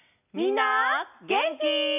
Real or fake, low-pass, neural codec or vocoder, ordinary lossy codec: real; 3.6 kHz; none; none